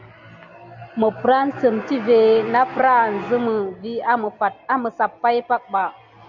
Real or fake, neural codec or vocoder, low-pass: real; none; 7.2 kHz